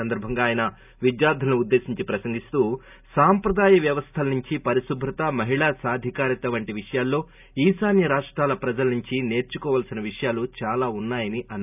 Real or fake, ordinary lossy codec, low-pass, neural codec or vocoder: real; none; 3.6 kHz; none